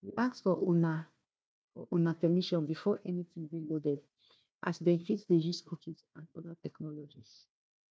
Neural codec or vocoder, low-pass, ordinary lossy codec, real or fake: codec, 16 kHz, 1 kbps, FunCodec, trained on Chinese and English, 50 frames a second; none; none; fake